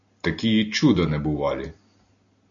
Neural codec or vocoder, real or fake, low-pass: none; real; 7.2 kHz